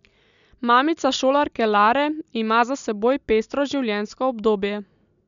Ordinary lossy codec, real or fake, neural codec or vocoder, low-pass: none; real; none; 7.2 kHz